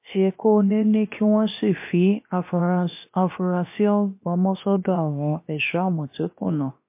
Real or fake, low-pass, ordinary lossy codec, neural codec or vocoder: fake; 3.6 kHz; MP3, 24 kbps; codec, 16 kHz, about 1 kbps, DyCAST, with the encoder's durations